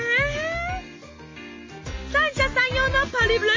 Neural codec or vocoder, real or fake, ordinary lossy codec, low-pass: none; real; MP3, 32 kbps; 7.2 kHz